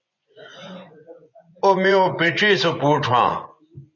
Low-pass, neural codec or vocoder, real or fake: 7.2 kHz; vocoder, 24 kHz, 100 mel bands, Vocos; fake